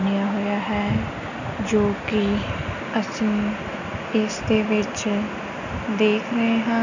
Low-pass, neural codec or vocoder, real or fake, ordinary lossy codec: 7.2 kHz; none; real; none